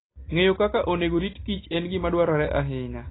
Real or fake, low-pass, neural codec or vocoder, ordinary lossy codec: real; 7.2 kHz; none; AAC, 16 kbps